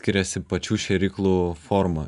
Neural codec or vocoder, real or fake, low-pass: none; real; 10.8 kHz